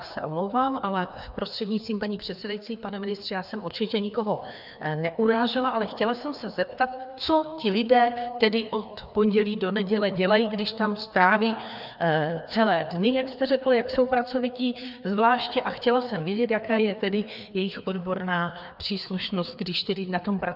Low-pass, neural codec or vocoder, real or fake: 5.4 kHz; codec, 16 kHz, 2 kbps, FreqCodec, larger model; fake